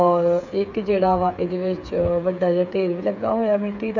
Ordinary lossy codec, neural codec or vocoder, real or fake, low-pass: none; codec, 16 kHz, 8 kbps, FreqCodec, smaller model; fake; 7.2 kHz